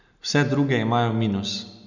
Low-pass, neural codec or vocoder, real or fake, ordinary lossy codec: 7.2 kHz; none; real; none